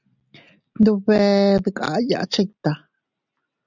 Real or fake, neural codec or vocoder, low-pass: real; none; 7.2 kHz